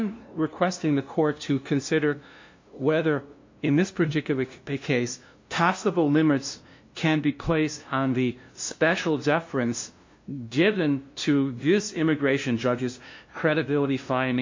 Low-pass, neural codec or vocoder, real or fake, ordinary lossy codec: 7.2 kHz; codec, 16 kHz, 0.5 kbps, FunCodec, trained on LibriTTS, 25 frames a second; fake; MP3, 48 kbps